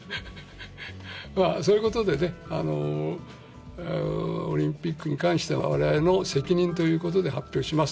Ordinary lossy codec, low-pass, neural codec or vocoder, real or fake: none; none; none; real